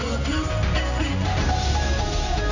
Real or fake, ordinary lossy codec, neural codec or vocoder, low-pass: fake; none; codec, 16 kHz in and 24 kHz out, 1 kbps, XY-Tokenizer; 7.2 kHz